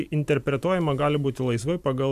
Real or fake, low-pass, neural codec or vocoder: fake; 14.4 kHz; vocoder, 44.1 kHz, 128 mel bands every 512 samples, BigVGAN v2